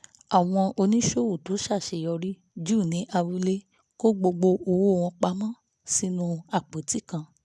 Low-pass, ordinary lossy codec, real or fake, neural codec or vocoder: none; none; real; none